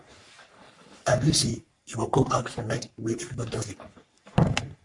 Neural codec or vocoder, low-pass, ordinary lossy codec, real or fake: codec, 44.1 kHz, 3.4 kbps, Pupu-Codec; 10.8 kHz; MP3, 64 kbps; fake